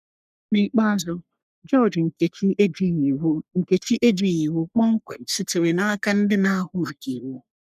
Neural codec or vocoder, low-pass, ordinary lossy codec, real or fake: codec, 44.1 kHz, 3.4 kbps, Pupu-Codec; 14.4 kHz; none; fake